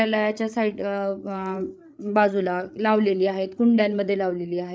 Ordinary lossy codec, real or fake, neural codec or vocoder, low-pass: none; fake; codec, 16 kHz, 8 kbps, FreqCodec, larger model; none